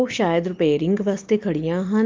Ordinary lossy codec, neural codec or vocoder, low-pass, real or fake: Opus, 32 kbps; none; 7.2 kHz; real